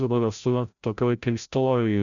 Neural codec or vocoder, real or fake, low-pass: codec, 16 kHz, 0.5 kbps, FreqCodec, larger model; fake; 7.2 kHz